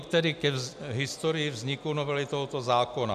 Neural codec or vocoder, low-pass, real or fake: none; 14.4 kHz; real